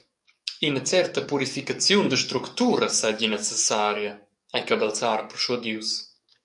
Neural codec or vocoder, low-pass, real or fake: codec, 44.1 kHz, 7.8 kbps, DAC; 10.8 kHz; fake